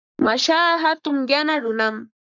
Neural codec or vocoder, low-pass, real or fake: codec, 44.1 kHz, 3.4 kbps, Pupu-Codec; 7.2 kHz; fake